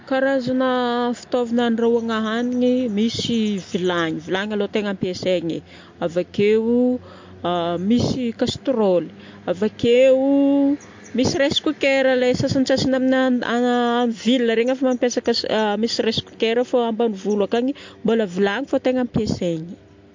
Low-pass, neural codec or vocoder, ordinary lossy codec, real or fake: 7.2 kHz; none; MP3, 48 kbps; real